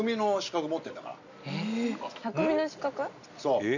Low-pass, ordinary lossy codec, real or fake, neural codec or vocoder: 7.2 kHz; none; real; none